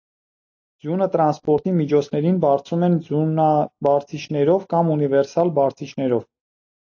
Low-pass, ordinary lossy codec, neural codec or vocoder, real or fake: 7.2 kHz; AAC, 48 kbps; none; real